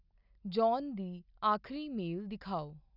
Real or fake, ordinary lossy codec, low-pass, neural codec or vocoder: real; none; 5.4 kHz; none